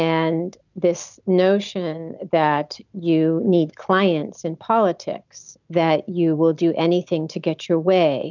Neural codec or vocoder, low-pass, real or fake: none; 7.2 kHz; real